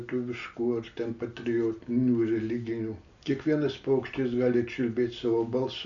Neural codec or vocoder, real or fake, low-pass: none; real; 7.2 kHz